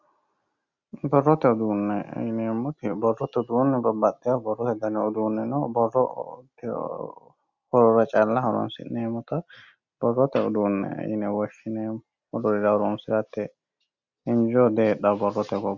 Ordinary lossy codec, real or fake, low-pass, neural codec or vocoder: Opus, 64 kbps; real; 7.2 kHz; none